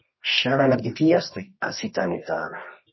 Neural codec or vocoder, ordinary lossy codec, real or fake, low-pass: codec, 24 kHz, 0.9 kbps, WavTokenizer, medium music audio release; MP3, 24 kbps; fake; 7.2 kHz